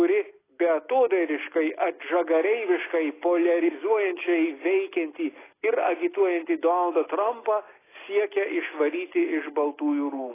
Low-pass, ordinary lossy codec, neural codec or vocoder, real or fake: 3.6 kHz; AAC, 16 kbps; none; real